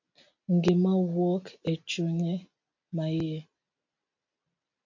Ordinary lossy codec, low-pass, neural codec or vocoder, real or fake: MP3, 48 kbps; 7.2 kHz; none; real